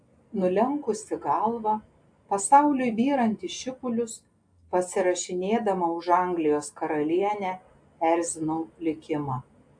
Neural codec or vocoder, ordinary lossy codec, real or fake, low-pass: none; AAC, 64 kbps; real; 9.9 kHz